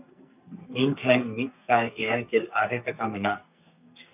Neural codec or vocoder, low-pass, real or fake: codec, 44.1 kHz, 2.6 kbps, SNAC; 3.6 kHz; fake